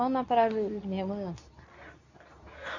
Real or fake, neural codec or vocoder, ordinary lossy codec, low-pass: fake; codec, 24 kHz, 0.9 kbps, WavTokenizer, medium speech release version 2; none; 7.2 kHz